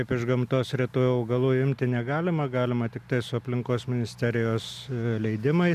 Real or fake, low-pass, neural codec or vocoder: real; 14.4 kHz; none